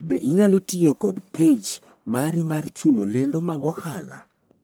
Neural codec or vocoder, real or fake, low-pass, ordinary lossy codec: codec, 44.1 kHz, 1.7 kbps, Pupu-Codec; fake; none; none